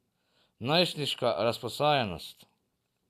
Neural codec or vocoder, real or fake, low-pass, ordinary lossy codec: none; real; 14.4 kHz; none